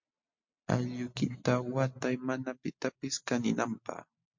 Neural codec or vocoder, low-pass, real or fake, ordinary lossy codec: none; 7.2 kHz; real; MP3, 48 kbps